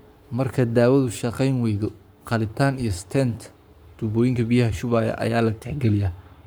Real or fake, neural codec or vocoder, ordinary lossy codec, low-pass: fake; codec, 44.1 kHz, 7.8 kbps, Pupu-Codec; none; none